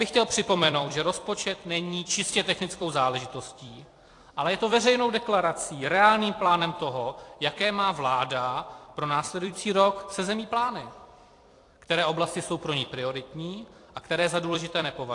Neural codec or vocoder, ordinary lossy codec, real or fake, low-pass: vocoder, 44.1 kHz, 128 mel bands every 256 samples, BigVGAN v2; AAC, 48 kbps; fake; 10.8 kHz